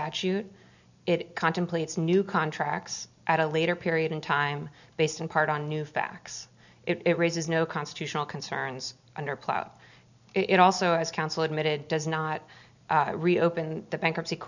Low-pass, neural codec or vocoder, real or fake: 7.2 kHz; none; real